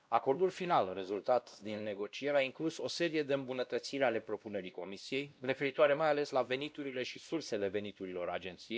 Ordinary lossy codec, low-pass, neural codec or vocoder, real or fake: none; none; codec, 16 kHz, 1 kbps, X-Codec, WavLM features, trained on Multilingual LibriSpeech; fake